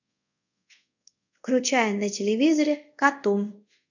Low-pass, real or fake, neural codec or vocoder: 7.2 kHz; fake; codec, 24 kHz, 0.5 kbps, DualCodec